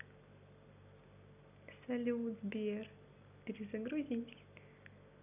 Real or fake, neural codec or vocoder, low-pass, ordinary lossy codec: real; none; 3.6 kHz; none